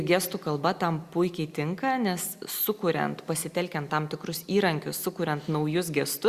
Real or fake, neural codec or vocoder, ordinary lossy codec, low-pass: real; none; Opus, 64 kbps; 14.4 kHz